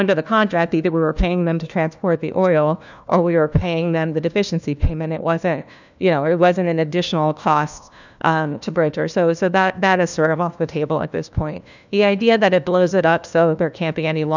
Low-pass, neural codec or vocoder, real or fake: 7.2 kHz; codec, 16 kHz, 1 kbps, FunCodec, trained on LibriTTS, 50 frames a second; fake